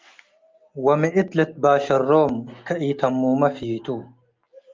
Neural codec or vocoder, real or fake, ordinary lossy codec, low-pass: none; real; Opus, 24 kbps; 7.2 kHz